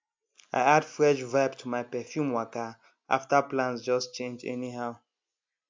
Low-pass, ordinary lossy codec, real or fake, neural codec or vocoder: 7.2 kHz; MP3, 64 kbps; real; none